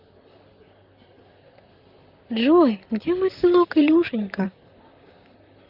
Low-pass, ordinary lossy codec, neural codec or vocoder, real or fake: 5.4 kHz; none; vocoder, 44.1 kHz, 128 mel bands, Pupu-Vocoder; fake